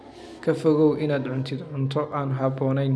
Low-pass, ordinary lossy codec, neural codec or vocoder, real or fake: none; none; none; real